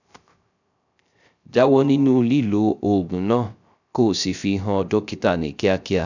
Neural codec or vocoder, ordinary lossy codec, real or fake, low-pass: codec, 16 kHz, 0.3 kbps, FocalCodec; none; fake; 7.2 kHz